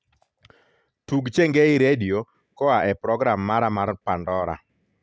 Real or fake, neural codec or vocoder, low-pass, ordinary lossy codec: real; none; none; none